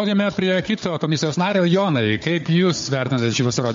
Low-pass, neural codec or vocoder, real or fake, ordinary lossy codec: 7.2 kHz; codec, 16 kHz, 4 kbps, FunCodec, trained on Chinese and English, 50 frames a second; fake; MP3, 48 kbps